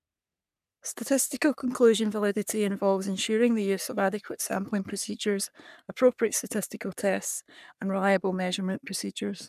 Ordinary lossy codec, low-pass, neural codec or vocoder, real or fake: none; 14.4 kHz; codec, 44.1 kHz, 3.4 kbps, Pupu-Codec; fake